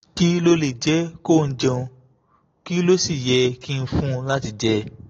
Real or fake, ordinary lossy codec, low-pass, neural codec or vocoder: real; AAC, 32 kbps; 7.2 kHz; none